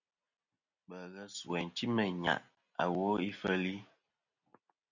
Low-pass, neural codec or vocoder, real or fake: 7.2 kHz; none; real